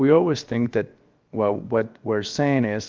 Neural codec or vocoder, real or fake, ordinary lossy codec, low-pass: codec, 16 kHz, 0.7 kbps, FocalCodec; fake; Opus, 24 kbps; 7.2 kHz